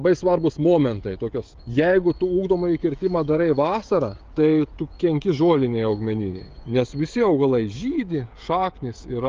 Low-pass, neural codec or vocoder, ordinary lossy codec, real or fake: 7.2 kHz; codec, 16 kHz, 16 kbps, FreqCodec, smaller model; Opus, 32 kbps; fake